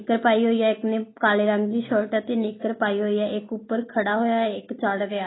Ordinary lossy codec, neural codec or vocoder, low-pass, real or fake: AAC, 16 kbps; none; 7.2 kHz; real